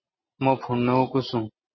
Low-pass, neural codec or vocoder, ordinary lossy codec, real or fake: 7.2 kHz; none; MP3, 24 kbps; real